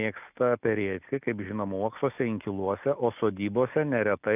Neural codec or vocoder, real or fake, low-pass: none; real; 3.6 kHz